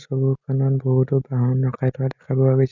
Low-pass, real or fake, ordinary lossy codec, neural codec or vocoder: 7.2 kHz; real; none; none